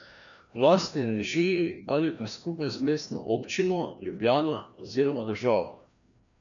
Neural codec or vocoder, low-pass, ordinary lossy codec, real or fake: codec, 16 kHz, 1 kbps, FreqCodec, larger model; 7.2 kHz; none; fake